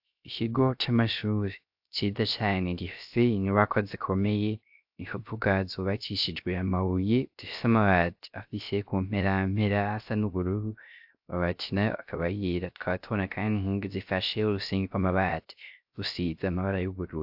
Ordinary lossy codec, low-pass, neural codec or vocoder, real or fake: AAC, 48 kbps; 5.4 kHz; codec, 16 kHz, 0.3 kbps, FocalCodec; fake